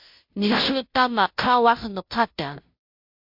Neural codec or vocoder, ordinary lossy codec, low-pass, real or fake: codec, 16 kHz, 0.5 kbps, FunCodec, trained on Chinese and English, 25 frames a second; MP3, 48 kbps; 5.4 kHz; fake